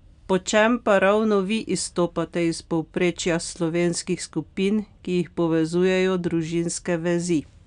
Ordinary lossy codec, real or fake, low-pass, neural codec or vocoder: none; real; 9.9 kHz; none